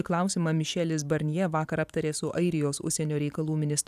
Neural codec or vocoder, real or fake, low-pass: vocoder, 44.1 kHz, 128 mel bands every 512 samples, BigVGAN v2; fake; 14.4 kHz